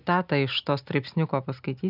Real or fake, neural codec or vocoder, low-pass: real; none; 5.4 kHz